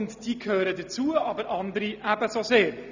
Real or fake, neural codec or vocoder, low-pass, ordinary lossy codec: real; none; 7.2 kHz; none